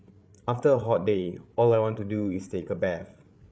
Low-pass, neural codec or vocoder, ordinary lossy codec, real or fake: none; codec, 16 kHz, 8 kbps, FreqCodec, larger model; none; fake